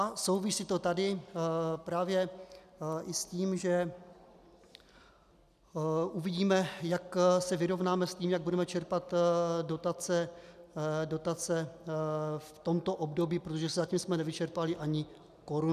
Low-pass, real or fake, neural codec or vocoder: 14.4 kHz; real; none